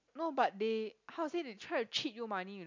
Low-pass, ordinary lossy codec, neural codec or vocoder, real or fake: 7.2 kHz; MP3, 64 kbps; none; real